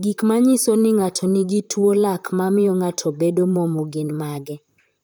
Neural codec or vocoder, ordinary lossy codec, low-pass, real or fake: vocoder, 44.1 kHz, 128 mel bands, Pupu-Vocoder; none; none; fake